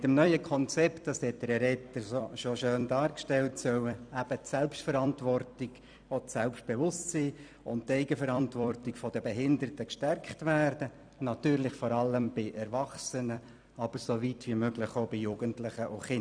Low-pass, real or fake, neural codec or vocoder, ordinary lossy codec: 9.9 kHz; fake; vocoder, 44.1 kHz, 128 mel bands every 256 samples, BigVGAN v2; none